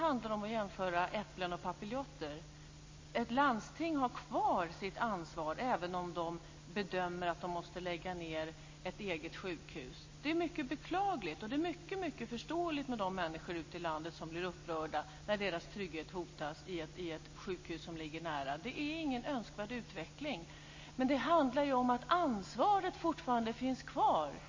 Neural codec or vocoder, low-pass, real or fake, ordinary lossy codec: none; 7.2 kHz; real; MP3, 32 kbps